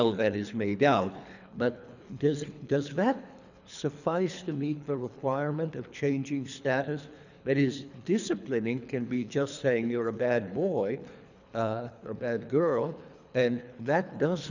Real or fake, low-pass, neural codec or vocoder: fake; 7.2 kHz; codec, 24 kHz, 3 kbps, HILCodec